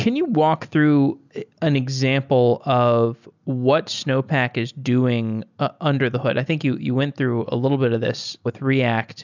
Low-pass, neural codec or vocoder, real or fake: 7.2 kHz; none; real